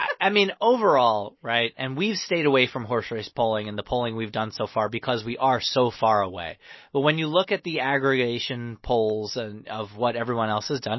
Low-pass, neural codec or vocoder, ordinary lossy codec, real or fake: 7.2 kHz; none; MP3, 24 kbps; real